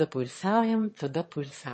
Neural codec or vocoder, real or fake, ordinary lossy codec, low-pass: autoencoder, 22.05 kHz, a latent of 192 numbers a frame, VITS, trained on one speaker; fake; MP3, 32 kbps; 9.9 kHz